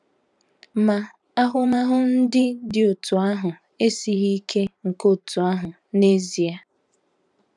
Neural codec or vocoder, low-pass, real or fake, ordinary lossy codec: vocoder, 44.1 kHz, 128 mel bands every 512 samples, BigVGAN v2; 10.8 kHz; fake; none